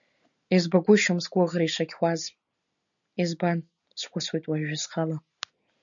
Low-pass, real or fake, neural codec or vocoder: 7.2 kHz; real; none